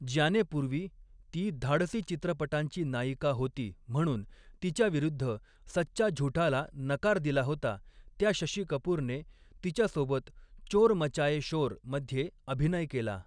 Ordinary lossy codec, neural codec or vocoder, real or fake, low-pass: none; none; real; 9.9 kHz